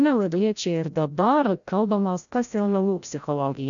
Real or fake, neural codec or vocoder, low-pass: fake; codec, 16 kHz, 0.5 kbps, FreqCodec, larger model; 7.2 kHz